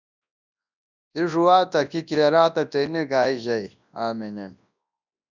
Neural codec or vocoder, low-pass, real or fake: codec, 24 kHz, 0.9 kbps, WavTokenizer, large speech release; 7.2 kHz; fake